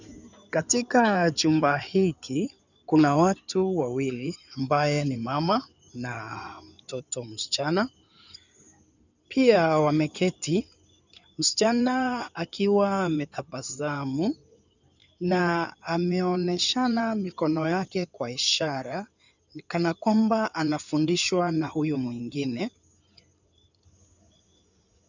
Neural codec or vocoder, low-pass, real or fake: codec, 16 kHz in and 24 kHz out, 2.2 kbps, FireRedTTS-2 codec; 7.2 kHz; fake